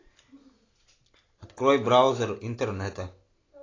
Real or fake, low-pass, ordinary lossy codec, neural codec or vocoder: real; 7.2 kHz; AAC, 32 kbps; none